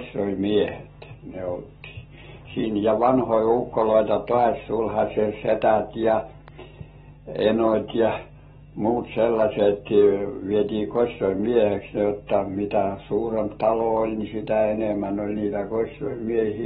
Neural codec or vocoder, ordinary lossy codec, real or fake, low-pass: none; AAC, 16 kbps; real; 10.8 kHz